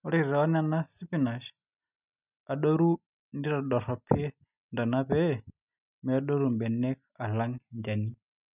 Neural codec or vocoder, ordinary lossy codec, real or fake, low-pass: none; none; real; 3.6 kHz